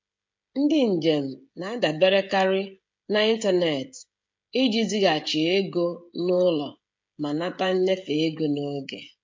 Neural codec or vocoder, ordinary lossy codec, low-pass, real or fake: codec, 16 kHz, 16 kbps, FreqCodec, smaller model; MP3, 48 kbps; 7.2 kHz; fake